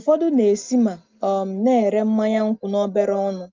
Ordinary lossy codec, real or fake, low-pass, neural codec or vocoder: Opus, 24 kbps; real; 7.2 kHz; none